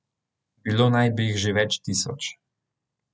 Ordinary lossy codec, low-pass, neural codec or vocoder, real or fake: none; none; none; real